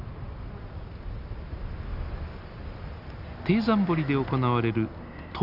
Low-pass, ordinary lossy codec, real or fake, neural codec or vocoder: 5.4 kHz; none; real; none